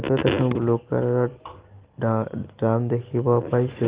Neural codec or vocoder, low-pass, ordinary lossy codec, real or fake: none; 3.6 kHz; Opus, 16 kbps; real